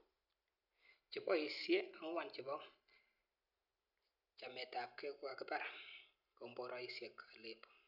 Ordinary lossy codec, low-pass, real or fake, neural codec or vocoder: none; 5.4 kHz; real; none